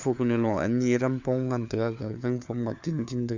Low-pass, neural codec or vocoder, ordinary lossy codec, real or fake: 7.2 kHz; codec, 16 kHz, 4 kbps, FunCodec, trained on LibriTTS, 50 frames a second; none; fake